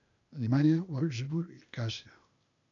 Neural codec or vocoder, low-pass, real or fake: codec, 16 kHz, 0.8 kbps, ZipCodec; 7.2 kHz; fake